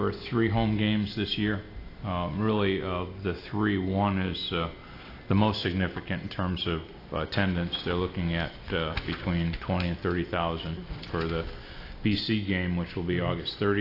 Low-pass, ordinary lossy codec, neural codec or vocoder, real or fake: 5.4 kHz; AAC, 32 kbps; none; real